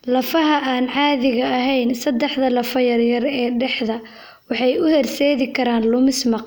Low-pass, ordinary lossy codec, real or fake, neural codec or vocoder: none; none; real; none